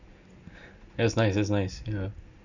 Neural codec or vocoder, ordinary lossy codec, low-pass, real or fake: none; none; 7.2 kHz; real